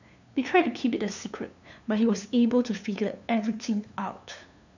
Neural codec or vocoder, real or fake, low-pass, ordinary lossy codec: codec, 16 kHz, 2 kbps, FunCodec, trained on LibriTTS, 25 frames a second; fake; 7.2 kHz; none